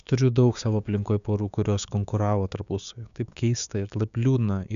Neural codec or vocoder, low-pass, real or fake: codec, 16 kHz, 6 kbps, DAC; 7.2 kHz; fake